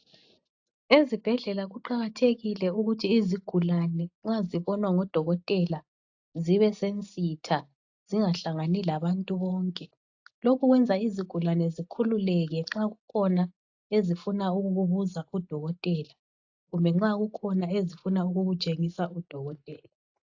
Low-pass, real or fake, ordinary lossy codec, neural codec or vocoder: 7.2 kHz; real; AAC, 48 kbps; none